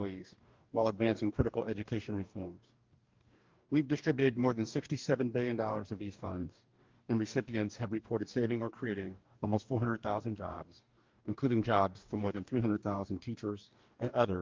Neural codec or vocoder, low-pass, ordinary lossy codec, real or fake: codec, 44.1 kHz, 2.6 kbps, DAC; 7.2 kHz; Opus, 32 kbps; fake